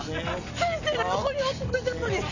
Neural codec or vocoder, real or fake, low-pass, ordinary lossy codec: none; real; 7.2 kHz; none